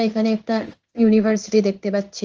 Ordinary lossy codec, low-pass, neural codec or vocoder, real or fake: Opus, 24 kbps; 7.2 kHz; codec, 16 kHz in and 24 kHz out, 1 kbps, XY-Tokenizer; fake